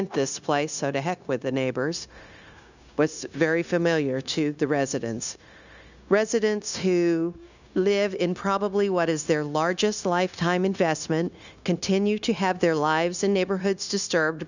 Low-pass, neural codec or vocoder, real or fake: 7.2 kHz; codec, 16 kHz, 0.9 kbps, LongCat-Audio-Codec; fake